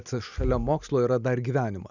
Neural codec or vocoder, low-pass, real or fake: none; 7.2 kHz; real